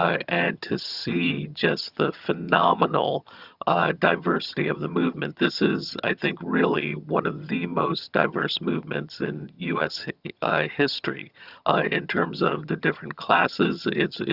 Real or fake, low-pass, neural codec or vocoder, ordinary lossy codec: fake; 5.4 kHz; vocoder, 22.05 kHz, 80 mel bands, HiFi-GAN; Opus, 64 kbps